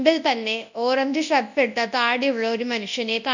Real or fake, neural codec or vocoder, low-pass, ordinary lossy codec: fake; codec, 24 kHz, 0.9 kbps, WavTokenizer, large speech release; 7.2 kHz; none